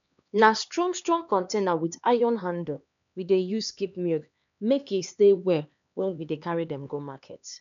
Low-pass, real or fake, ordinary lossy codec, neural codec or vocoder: 7.2 kHz; fake; MP3, 96 kbps; codec, 16 kHz, 2 kbps, X-Codec, HuBERT features, trained on LibriSpeech